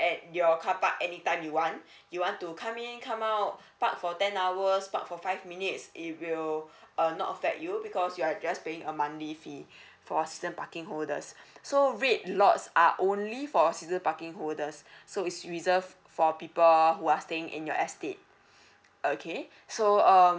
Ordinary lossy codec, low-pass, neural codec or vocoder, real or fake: none; none; none; real